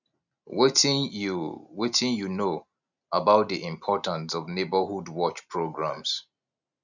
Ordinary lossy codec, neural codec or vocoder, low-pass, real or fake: none; none; 7.2 kHz; real